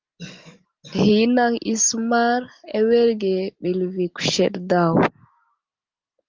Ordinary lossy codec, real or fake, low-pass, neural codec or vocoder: Opus, 32 kbps; real; 7.2 kHz; none